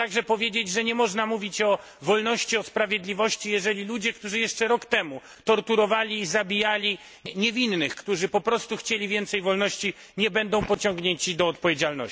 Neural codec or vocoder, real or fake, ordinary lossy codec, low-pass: none; real; none; none